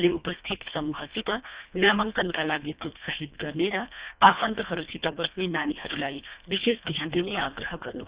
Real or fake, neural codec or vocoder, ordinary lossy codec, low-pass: fake; codec, 24 kHz, 1.5 kbps, HILCodec; Opus, 64 kbps; 3.6 kHz